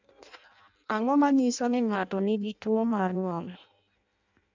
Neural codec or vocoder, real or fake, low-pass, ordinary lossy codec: codec, 16 kHz in and 24 kHz out, 0.6 kbps, FireRedTTS-2 codec; fake; 7.2 kHz; MP3, 64 kbps